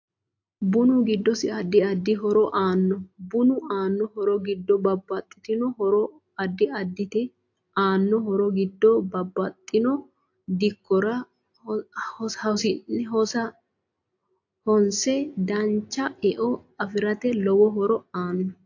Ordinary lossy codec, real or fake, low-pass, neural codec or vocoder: AAC, 48 kbps; real; 7.2 kHz; none